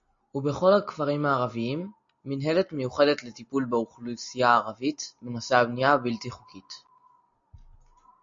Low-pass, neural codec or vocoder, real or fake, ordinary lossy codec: 7.2 kHz; none; real; MP3, 48 kbps